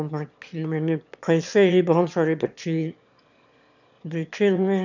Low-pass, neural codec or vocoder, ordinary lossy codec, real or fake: 7.2 kHz; autoencoder, 22.05 kHz, a latent of 192 numbers a frame, VITS, trained on one speaker; none; fake